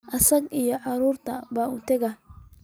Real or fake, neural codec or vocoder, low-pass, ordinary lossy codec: real; none; none; none